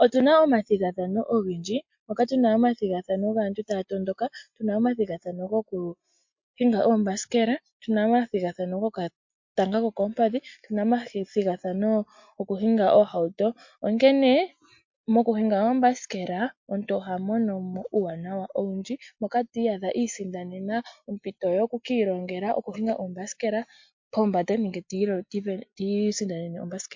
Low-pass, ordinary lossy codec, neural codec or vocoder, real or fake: 7.2 kHz; MP3, 48 kbps; none; real